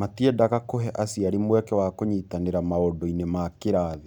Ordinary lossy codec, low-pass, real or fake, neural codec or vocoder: none; 19.8 kHz; real; none